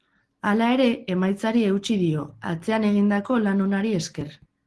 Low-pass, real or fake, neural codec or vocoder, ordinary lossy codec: 10.8 kHz; real; none; Opus, 16 kbps